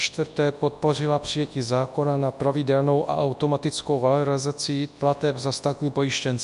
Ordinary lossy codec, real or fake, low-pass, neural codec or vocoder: MP3, 64 kbps; fake; 10.8 kHz; codec, 24 kHz, 0.9 kbps, WavTokenizer, large speech release